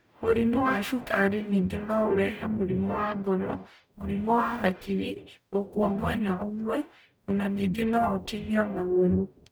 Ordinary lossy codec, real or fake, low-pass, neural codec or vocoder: none; fake; none; codec, 44.1 kHz, 0.9 kbps, DAC